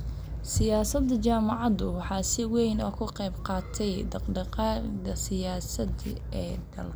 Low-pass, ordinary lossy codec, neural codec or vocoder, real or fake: none; none; none; real